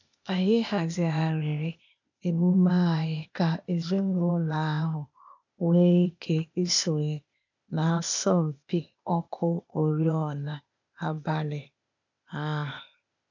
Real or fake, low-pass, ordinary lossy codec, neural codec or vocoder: fake; 7.2 kHz; none; codec, 16 kHz, 0.8 kbps, ZipCodec